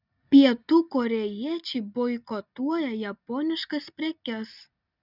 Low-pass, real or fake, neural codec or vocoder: 5.4 kHz; real; none